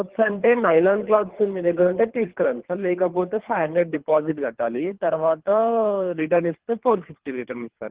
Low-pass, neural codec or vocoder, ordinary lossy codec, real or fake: 3.6 kHz; codec, 24 kHz, 3 kbps, HILCodec; Opus, 16 kbps; fake